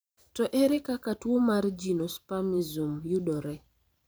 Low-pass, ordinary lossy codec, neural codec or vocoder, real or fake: none; none; vocoder, 44.1 kHz, 128 mel bands every 512 samples, BigVGAN v2; fake